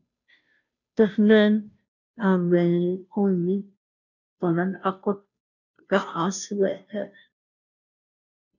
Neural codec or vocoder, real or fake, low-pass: codec, 16 kHz, 0.5 kbps, FunCodec, trained on Chinese and English, 25 frames a second; fake; 7.2 kHz